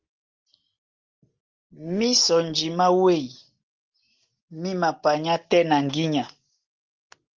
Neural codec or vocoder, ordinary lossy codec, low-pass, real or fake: none; Opus, 24 kbps; 7.2 kHz; real